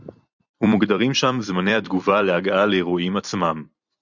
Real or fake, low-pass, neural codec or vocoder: fake; 7.2 kHz; vocoder, 44.1 kHz, 128 mel bands every 256 samples, BigVGAN v2